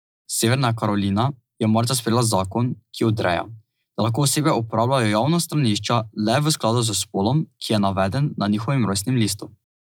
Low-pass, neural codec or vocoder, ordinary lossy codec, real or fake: none; none; none; real